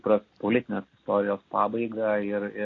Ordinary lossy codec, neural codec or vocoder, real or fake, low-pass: AAC, 32 kbps; none; real; 7.2 kHz